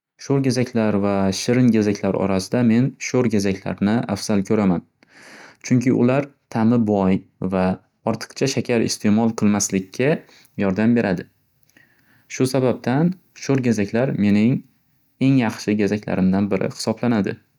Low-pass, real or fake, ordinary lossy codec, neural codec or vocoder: 19.8 kHz; real; none; none